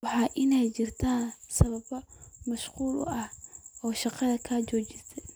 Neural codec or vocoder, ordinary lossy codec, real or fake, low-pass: none; none; real; none